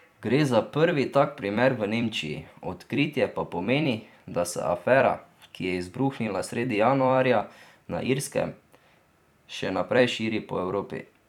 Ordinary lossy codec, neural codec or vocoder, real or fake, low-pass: none; vocoder, 44.1 kHz, 128 mel bands every 256 samples, BigVGAN v2; fake; 19.8 kHz